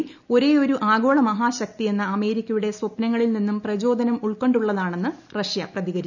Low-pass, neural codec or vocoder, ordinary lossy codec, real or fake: 7.2 kHz; none; none; real